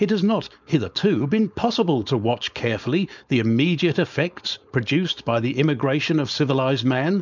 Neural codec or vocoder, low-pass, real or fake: codec, 16 kHz, 4.8 kbps, FACodec; 7.2 kHz; fake